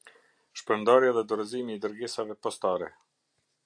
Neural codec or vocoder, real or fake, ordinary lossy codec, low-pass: none; real; AAC, 64 kbps; 9.9 kHz